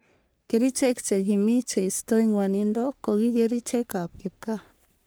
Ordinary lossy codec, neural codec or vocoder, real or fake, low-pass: none; codec, 44.1 kHz, 3.4 kbps, Pupu-Codec; fake; none